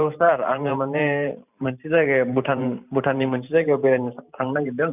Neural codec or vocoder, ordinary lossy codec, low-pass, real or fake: vocoder, 44.1 kHz, 128 mel bands every 512 samples, BigVGAN v2; none; 3.6 kHz; fake